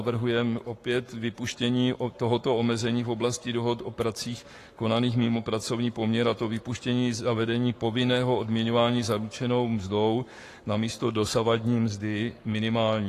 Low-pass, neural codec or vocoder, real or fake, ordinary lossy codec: 14.4 kHz; codec, 44.1 kHz, 7.8 kbps, Pupu-Codec; fake; AAC, 48 kbps